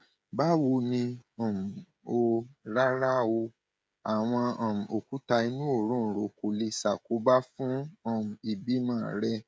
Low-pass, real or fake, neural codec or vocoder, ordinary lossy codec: none; fake; codec, 16 kHz, 16 kbps, FreqCodec, smaller model; none